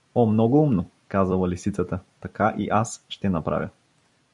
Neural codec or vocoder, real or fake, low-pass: vocoder, 44.1 kHz, 128 mel bands every 256 samples, BigVGAN v2; fake; 10.8 kHz